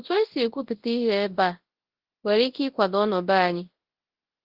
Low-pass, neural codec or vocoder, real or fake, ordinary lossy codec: 5.4 kHz; codec, 24 kHz, 0.9 kbps, WavTokenizer, large speech release; fake; Opus, 16 kbps